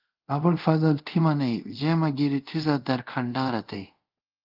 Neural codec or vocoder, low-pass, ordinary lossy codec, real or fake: codec, 24 kHz, 0.5 kbps, DualCodec; 5.4 kHz; Opus, 24 kbps; fake